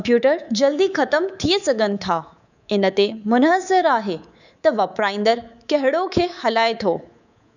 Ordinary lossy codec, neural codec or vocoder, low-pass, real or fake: none; codec, 24 kHz, 3.1 kbps, DualCodec; 7.2 kHz; fake